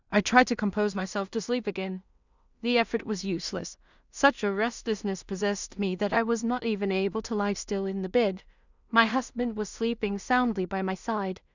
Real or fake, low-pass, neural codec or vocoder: fake; 7.2 kHz; codec, 16 kHz in and 24 kHz out, 0.4 kbps, LongCat-Audio-Codec, two codebook decoder